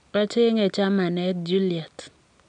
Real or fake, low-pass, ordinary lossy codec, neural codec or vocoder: real; 9.9 kHz; none; none